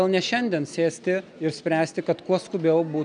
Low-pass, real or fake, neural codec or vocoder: 9.9 kHz; real; none